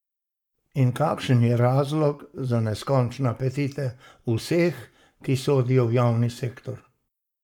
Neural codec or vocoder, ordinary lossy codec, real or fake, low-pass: codec, 44.1 kHz, 7.8 kbps, Pupu-Codec; none; fake; 19.8 kHz